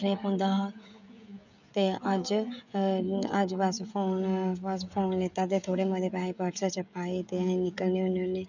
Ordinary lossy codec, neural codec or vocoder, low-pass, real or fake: none; codec, 16 kHz, 16 kbps, FreqCodec, smaller model; 7.2 kHz; fake